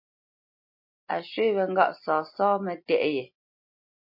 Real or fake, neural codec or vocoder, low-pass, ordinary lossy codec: real; none; 5.4 kHz; MP3, 32 kbps